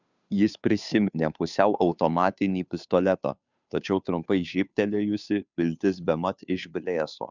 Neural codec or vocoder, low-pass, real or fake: codec, 16 kHz, 2 kbps, FunCodec, trained on Chinese and English, 25 frames a second; 7.2 kHz; fake